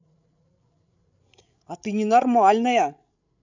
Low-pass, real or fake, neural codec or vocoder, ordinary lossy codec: 7.2 kHz; fake; codec, 16 kHz, 16 kbps, FreqCodec, larger model; none